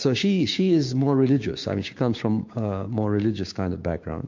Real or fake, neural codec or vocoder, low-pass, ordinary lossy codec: fake; codec, 16 kHz, 6 kbps, DAC; 7.2 kHz; MP3, 48 kbps